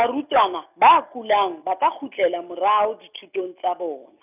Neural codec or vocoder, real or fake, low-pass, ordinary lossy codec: none; real; 3.6 kHz; none